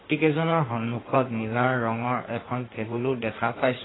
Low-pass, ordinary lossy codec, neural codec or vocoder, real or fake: 7.2 kHz; AAC, 16 kbps; codec, 16 kHz in and 24 kHz out, 1.1 kbps, FireRedTTS-2 codec; fake